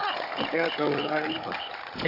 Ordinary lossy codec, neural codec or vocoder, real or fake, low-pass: AAC, 32 kbps; codec, 16 kHz, 16 kbps, FunCodec, trained on Chinese and English, 50 frames a second; fake; 5.4 kHz